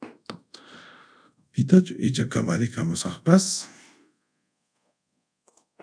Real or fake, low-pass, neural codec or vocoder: fake; 9.9 kHz; codec, 24 kHz, 0.5 kbps, DualCodec